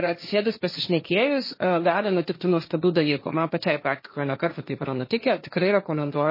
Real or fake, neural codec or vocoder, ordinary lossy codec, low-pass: fake; codec, 16 kHz, 1.1 kbps, Voila-Tokenizer; MP3, 24 kbps; 5.4 kHz